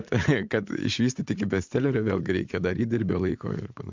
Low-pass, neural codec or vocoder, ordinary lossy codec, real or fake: 7.2 kHz; vocoder, 44.1 kHz, 80 mel bands, Vocos; MP3, 64 kbps; fake